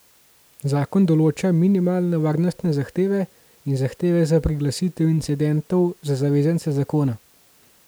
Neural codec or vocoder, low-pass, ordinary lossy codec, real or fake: none; none; none; real